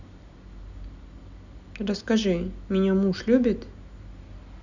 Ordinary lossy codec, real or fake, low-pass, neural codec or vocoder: none; real; 7.2 kHz; none